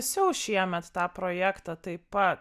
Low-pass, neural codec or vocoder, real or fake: 14.4 kHz; none; real